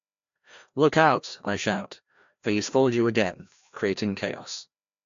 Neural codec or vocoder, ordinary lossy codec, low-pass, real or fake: codec, 16 kHz, 1 kbps, FreqCodec, larger model; AAC, 64 kbps; 7.2 kHz; fake